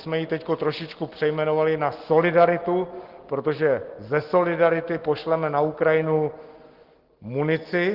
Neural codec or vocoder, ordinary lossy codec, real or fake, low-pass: none; Opus, 16 kbps; real; 5.4 kHz